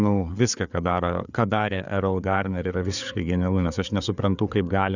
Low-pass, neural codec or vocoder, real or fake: 7.2 kHz; codec, 16 kHz, 4 kbps, FreqCodec, larger model; fake